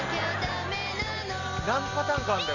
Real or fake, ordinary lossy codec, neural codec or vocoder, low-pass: real; none; none; 7.2 kHz